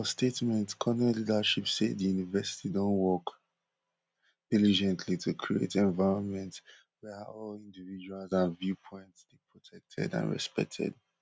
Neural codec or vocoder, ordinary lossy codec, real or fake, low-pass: none; none; real; none